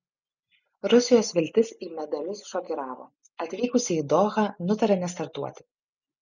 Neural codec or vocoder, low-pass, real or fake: none; 7.2 kHz; real